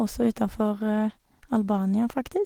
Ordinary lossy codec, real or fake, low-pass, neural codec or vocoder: Opus, 16 kbps; real; 19.8 kHz; none